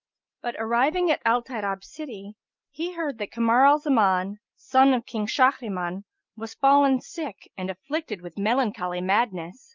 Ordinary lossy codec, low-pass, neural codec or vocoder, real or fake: Opus, 24 kbps; 7.2 kHz; none; real